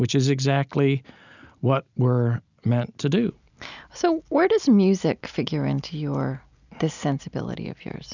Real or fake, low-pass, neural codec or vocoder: real; 7.2 kHz; none